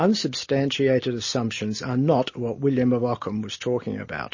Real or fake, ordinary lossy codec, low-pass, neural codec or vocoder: real; MP3, 32 kbps; 7.2 kHz; none